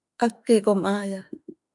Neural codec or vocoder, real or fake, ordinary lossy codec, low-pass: autoencoder, 48 kHz, 32 numbers a frame, DAC-VAE, trained on Japanese speech; fake; MP3, 64 kbps; 10.8 kHz